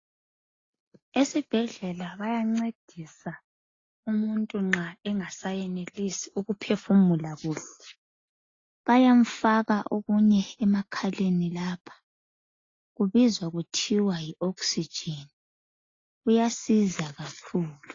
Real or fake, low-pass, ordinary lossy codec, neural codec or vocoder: real; 7.2 kHz; AAC, 32 kbps; none